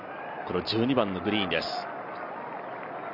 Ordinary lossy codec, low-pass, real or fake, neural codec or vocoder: none; 5.4 kHz; real; none